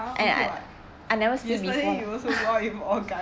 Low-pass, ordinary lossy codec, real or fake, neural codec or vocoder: none; none; real; none